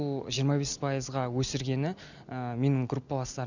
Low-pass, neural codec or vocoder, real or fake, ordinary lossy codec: 7.2 kHz; none; real; none